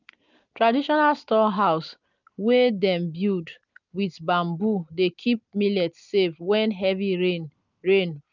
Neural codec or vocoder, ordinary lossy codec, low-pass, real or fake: none; none; 7.2 kHz; real